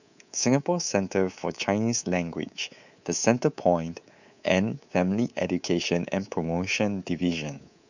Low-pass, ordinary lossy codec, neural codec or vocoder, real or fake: 7.2 kHz; none; codec, 24 kHz, 3.1 kbps, DualCodec; fake